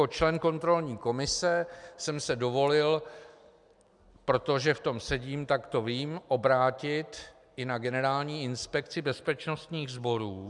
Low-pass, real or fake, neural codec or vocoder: 10.8 kHz; real; none